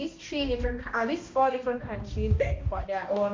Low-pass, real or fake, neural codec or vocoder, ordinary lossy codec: 7.2 kHz; fake; codec, 16 kHz, 1 kbps, X-Codec, HuBERT features, trained on balanced general audio; MP3, 64 kbps